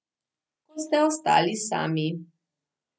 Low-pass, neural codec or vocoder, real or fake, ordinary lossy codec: none; none; real; none